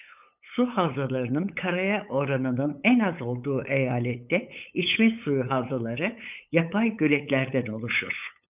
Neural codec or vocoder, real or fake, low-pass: codec, 16 kHz, 8 kbps, FunCodec, trained on LibriTTS, 25 frames a second; fake; 3.6 kHz